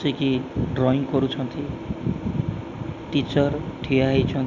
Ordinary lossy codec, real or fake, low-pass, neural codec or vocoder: none; real; 7.2 kHz; none